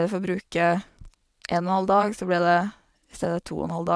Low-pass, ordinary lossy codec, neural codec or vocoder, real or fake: none; none; vocoder, 22.05 kHz, 80 mel bands, WaveNeXt; fake